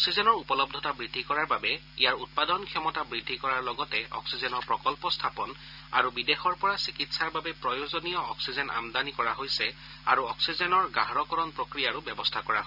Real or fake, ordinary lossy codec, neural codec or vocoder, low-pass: real; none; none; 5.4 kHz